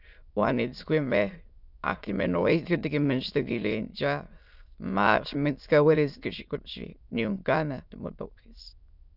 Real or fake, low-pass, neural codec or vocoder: fake; 5.4 kHz; autoencoder, 22.05 kHz, a latent of 192 numbers a frame, VITS, trained on many speakers